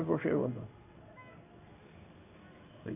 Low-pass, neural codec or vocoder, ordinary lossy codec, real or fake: 3.6 kHz; codec, 16 kHz in and 24 kHz out, 1 kbps, XY-Tokenizer; none; fake